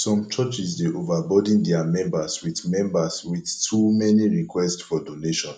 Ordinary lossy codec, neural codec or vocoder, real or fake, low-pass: none; none; real; 9.9 kHz